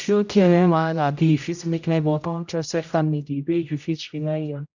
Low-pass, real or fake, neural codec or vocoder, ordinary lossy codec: 7.2 kHz; fake; codec, 16 kHz, 0.5 kbps, X-Codec, HuBERT features, trained on general audio; none